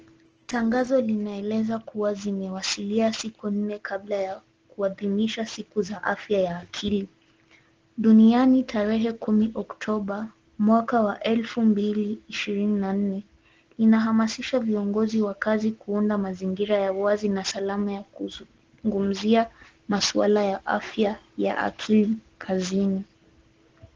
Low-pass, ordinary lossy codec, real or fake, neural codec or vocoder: 7.2 kHz; Opus, 16 kbps; real; none